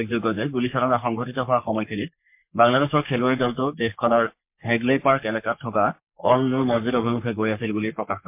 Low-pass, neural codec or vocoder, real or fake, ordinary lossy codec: 3.6 kHz; codec, 44.1 kHz, 3.4 kbps, Pupu-Codec; fake; MP3, 32 kbps